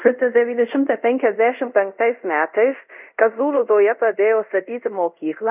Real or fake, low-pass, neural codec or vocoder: fake; 3.6 kHz; codec, 24 kHz, 0.5 kbps, DualCodec